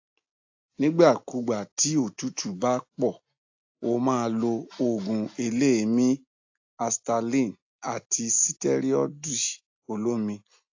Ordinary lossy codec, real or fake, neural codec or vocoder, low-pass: AAC, 48 kbps; real; none; 7.2 kHz